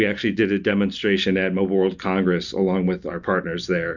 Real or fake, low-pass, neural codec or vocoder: real; 7.2 kHz; none